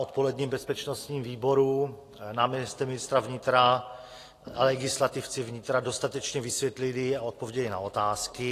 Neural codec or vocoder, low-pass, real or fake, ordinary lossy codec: none; 14.4 kHz; real; AAC, 48 kbps